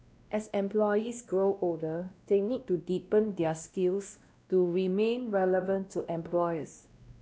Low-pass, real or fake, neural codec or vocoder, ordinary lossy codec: none; fake; codec, 16 kHz, 1 kbps, X-Codec, WavLM features, trained on Multilingual LibriSpeech; none